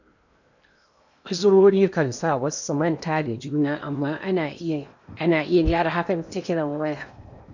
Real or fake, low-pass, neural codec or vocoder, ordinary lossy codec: fake; 7.2 kHz; codec, 16 kHz in and 24 kHz out, 0.8 kbps, FocalCodec, streaming, 65536 codes; none